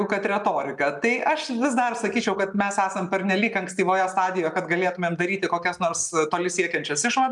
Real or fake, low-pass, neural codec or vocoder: real; 10.8 kHz; none